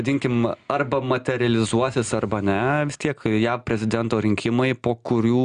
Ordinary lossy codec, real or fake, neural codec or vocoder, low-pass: Opus, 64 kbps; real; none; 9.9 kHz